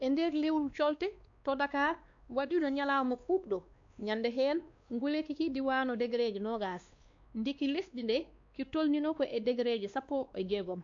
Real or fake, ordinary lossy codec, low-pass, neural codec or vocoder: fake; AAC, 64 kbps; 7.2 kHz; codec, 16 kHz, 2 kbps, X-Codec, WavLM features, trained on Multilingual LibriSpeech